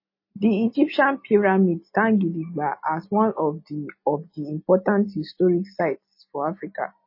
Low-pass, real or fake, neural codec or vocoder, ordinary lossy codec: 5.4 kHz; real; none; MP3, 24 kbps